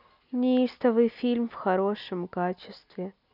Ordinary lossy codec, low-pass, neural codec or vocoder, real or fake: none; 5.4 kHz; none; real